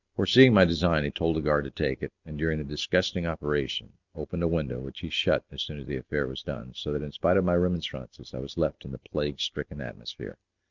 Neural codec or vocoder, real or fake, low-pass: none; real; 7.2 kHz